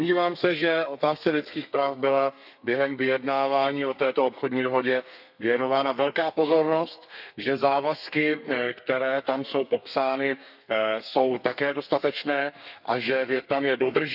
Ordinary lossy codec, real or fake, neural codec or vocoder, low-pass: none; fake; codec, 32 kHz, 1.9 kbps, SNAC; 5.4 kHz